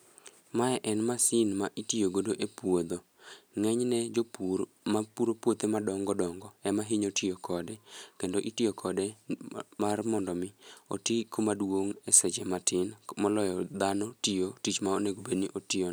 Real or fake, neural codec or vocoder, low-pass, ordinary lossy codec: real; none; none; none